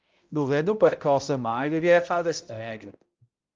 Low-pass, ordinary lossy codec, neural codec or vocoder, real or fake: 7.2 kHz; Opus, 32 kbps; codec, 16 kHz, 0.5 kbps, X-Codec, HuBERT features, trained on balanced general audio; fake